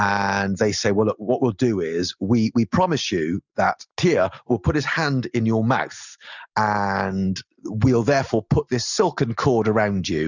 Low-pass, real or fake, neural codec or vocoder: 7.2 kHz; real; none